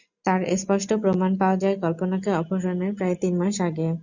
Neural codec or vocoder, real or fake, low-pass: none; real; 7.2 kHz